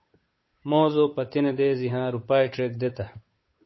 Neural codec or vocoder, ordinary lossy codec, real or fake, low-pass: codec, 16 kHz, 8 kbps, FunCodec, trained on Chinese and English, 25 frames a second; MP3, 24 kbps; fake; 7.2 kHz